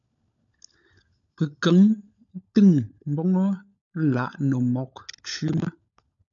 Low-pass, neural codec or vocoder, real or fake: 7.2 kHz; codec, 16 kHz, 16 kbps, FunCodec, trained on LibriTTS, 50 frames a second; fake